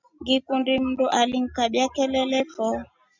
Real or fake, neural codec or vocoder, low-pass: real; none; 7.2 kHz